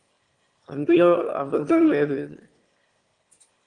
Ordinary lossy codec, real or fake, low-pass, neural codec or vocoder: Opus, 24 kbps; fake; 9.9 kHz; autoencoder, 22.05 kHz, a latent of 192 numbers a frame, VITS, trained on one speaker